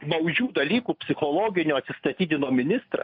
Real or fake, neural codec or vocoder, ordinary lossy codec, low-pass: real; none; MP3, 48 kbps; 5.4 kHz